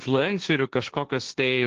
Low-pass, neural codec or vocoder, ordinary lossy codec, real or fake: 7.2 kHz; codec, 16 kHz, 1.1 kbps, Voila-Tokenizer; Opus, 16 kbps; fake